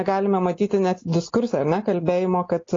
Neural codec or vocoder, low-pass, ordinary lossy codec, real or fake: none; 7.2 kHz; AAC, 32 kbps; real